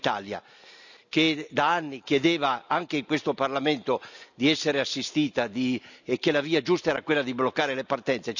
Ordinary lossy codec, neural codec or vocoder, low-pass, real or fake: none; none; 7.2 kHz; real